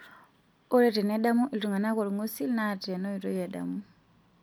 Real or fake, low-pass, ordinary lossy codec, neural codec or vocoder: real; none; none; none